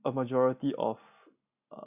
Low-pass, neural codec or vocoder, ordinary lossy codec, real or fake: 3.6 kHz; none; none; real